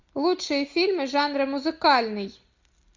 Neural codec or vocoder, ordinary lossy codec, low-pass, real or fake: none; MP3, 64 kbps; 7.2 kHz; real